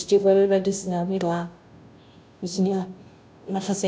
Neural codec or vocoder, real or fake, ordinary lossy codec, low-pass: codec, 16 kHz, 0.5 kbps, FunCodec, trained on Chinese and English, 25 frames a second; fake; none; none